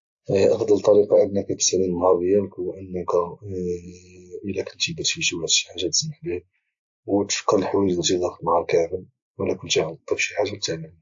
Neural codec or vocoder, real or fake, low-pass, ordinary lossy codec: none; real; 7.2 kHz; AAC, 64 kbps